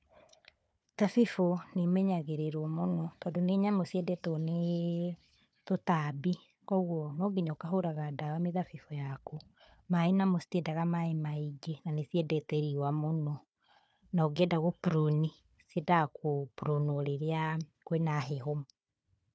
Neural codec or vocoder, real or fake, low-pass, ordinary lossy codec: codec, 16 kHz, 4 kbps, FunCodec, trained on Chinese and English, 50 frames a second; fake; none; none